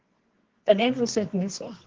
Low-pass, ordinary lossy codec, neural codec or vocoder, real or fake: 7.2 kHz; Opus, 16 kbps; codec, 24 kHz, 0.9 kbps, WavTokenizer, medium music audio release; fake